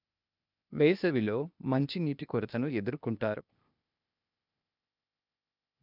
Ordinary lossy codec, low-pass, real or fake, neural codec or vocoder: none; 5.4 kHz; fake; codec, 16 kHz, 0.8 kbps, ZipCodec